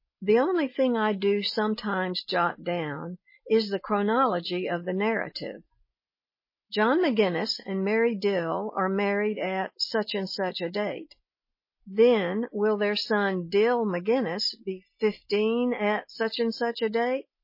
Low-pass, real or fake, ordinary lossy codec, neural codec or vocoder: 5.4 kHz; real; MP3, 24 kbps; none